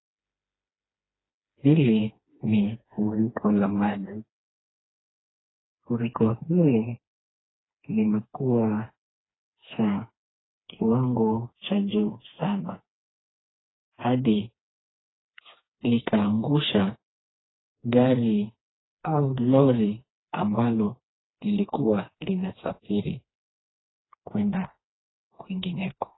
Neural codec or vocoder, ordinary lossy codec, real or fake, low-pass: codec, 16 kHz, 2 kbps, FreqCodec, smaller model; AAC, 16 kbps; fake; 7.2 kHz